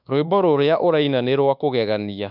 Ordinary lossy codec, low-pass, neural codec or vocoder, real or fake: none; 5.4 kHz; codec, 24 kHz, 1.2 kbps, DualCodec; fake